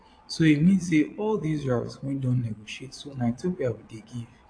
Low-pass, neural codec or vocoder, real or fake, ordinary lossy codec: 9.9 kHz; vocoder, 22.05 kHz, 80 mel bands, Vocos; fake; AAC, 48 kbps